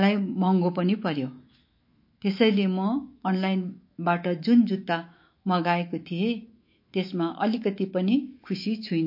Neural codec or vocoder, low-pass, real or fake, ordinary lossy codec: autoencoder, 48 kHz, 128 numbers a frame, DAC-VAE, trained on Japanese speech; 5.4 kHz; fake; MP3, 32 kbps